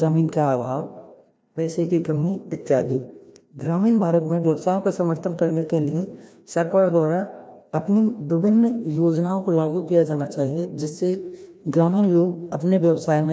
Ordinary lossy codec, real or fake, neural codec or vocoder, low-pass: none; fake; codec, 16 kHz, 1 kbps, FreqCodec, larger model; none